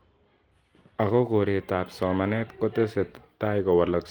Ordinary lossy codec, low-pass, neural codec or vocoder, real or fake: Opus, 24 kbps; 19.8 kHz; vocoder, 44.1 kHz, 128 mel bands every 256 samples, BigVGAN v2; fake